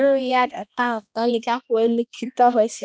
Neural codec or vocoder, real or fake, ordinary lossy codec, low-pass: codec, 16 kHz, 1 kbps, X-Codec, HuBERT features, trained on balanced general audio; fake; none; none